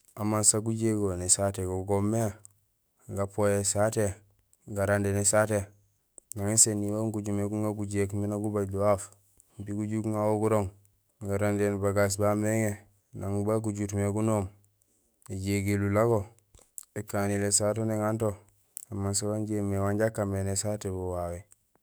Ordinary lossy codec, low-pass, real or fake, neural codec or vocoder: none; none; fake; autoencoder, 48 kHz, 128 numbers a frame, DAC-VAE, trained on Japanese speech